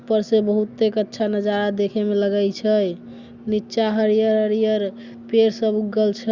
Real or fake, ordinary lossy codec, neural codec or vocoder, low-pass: real; Opus, 64 kbps; none; 7.2 kHz